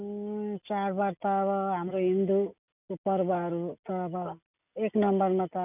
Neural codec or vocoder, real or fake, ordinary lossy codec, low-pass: none; real; none; 3.6 kHz